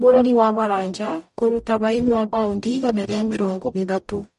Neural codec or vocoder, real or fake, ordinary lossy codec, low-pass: codec, 44.1 kHz, 0.9 kbps, DAC; fake; MP3, 48 kbps; 14.4 kHz